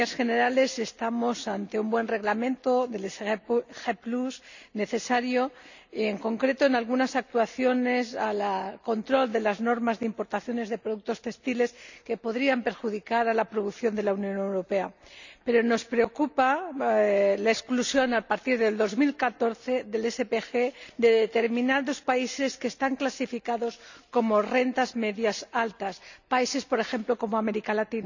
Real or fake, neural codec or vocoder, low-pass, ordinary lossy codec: real; none; 7.2 kHz; none